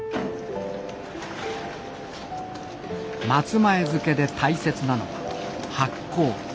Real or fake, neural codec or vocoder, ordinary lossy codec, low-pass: real; none; none; none